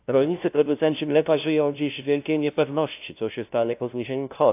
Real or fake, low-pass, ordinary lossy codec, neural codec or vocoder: fake; 3.6 kHz; AAC, 32 kbps; codec, 16 kHz, 0.5 kbps, FunCodec, trained on LibriTTS, 25 frames a second